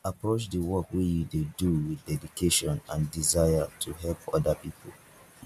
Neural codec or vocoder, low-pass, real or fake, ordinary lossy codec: none; 14.4 kHz; real; none